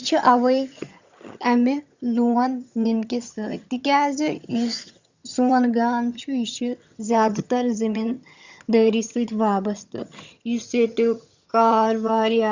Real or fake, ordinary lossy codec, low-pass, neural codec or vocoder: fake; Opus, 64 kbps; 7.2 kHz; vocoder, 22.05 kHz, 80 mel bands, HiFi-GAN